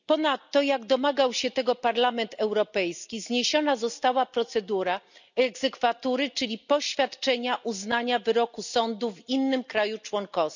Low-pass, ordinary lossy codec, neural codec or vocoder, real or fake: 7.2 kHz; none; none; real